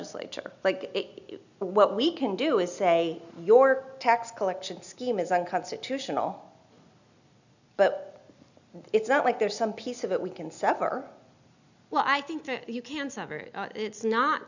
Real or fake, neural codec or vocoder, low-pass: real; none; 7.2 kHz